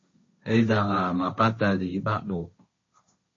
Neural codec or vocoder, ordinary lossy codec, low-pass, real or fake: codec, 16 kHz, 1.1 kbps, Voila-Tokenizer; MP3, 32 kbps; 7.2 kHz; fake